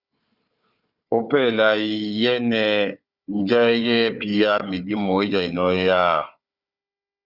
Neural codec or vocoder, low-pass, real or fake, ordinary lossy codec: codec, 16 kHz, 4 kbps, FunCodec, trained on Chinese and English, 50 frames a second; 5.4 kHz; fake; Opus, 64 kbps